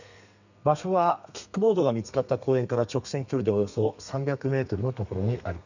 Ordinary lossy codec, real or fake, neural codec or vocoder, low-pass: none; fake; codec, 32 kHz, 1.9 kbps, SNAC; 7.2 kHz